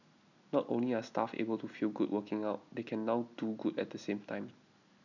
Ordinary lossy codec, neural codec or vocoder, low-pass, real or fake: none; none; 7.2 kHz; real